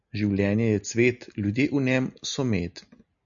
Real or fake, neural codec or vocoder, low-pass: real; none; 7.2 kHz